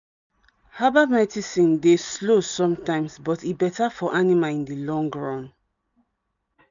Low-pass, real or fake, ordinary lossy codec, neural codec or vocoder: 7.2 kHz; real; none; none